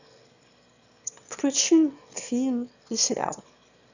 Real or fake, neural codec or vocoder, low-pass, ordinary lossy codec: fake; autoencoder, 22.05 kHz, a latent of 192 numbers a frame, VITS, trained on one speaker; 7.2 kHz; none